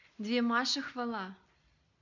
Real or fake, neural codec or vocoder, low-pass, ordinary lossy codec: real; none; 7.2 kHz; none